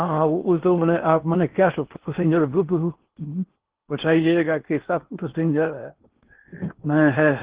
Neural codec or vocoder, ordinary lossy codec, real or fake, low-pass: codec, 16 kHz in and 24 kHz out, 0.8 kbps, FocalCodec, streaming, 65536 codes; Opus, 16 kbps; fake; 3.6 kHz